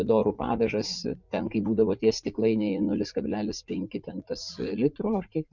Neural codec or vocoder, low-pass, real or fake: vocoder, 44.1 kHz, 80 mel bands, Vocos; 7.2 kHz; fake